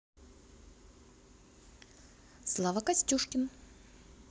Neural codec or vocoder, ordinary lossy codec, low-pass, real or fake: none; none; none; real